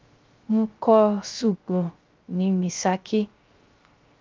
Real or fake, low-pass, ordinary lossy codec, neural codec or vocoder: fake; 7.2 kHz; Opus, 32 kbps; codec, 16 kHz, 0.3 kbps, FocalCodec